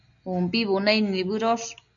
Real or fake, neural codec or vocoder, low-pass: real; none; 7.2 kHz